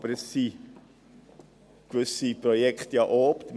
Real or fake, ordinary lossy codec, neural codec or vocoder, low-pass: real; none; none; none